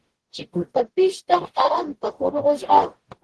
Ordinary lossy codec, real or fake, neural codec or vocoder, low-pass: Opus, 16 kbps; fake; codec, 44.1 kHz, 0.9 kbps, DAC; 10.8 kHz